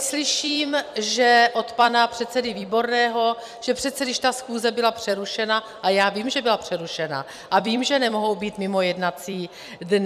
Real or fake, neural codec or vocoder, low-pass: fake; vocoder, 44.1 kHz, 128 mel bands every 256 samples, BigVGAN v2; 14.4 kHz